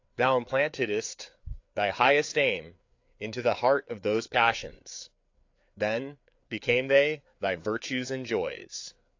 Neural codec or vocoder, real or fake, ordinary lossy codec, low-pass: codec, 16 kHz, 4 kbps, FreqCodec, larger model; fake; AAC, 48 kbps; 7.2 kHz